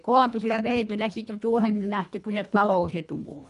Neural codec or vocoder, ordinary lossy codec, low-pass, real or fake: codec, 24 kHz, 1.5 kbps, HILCodec; none; 10.8 kHz; fake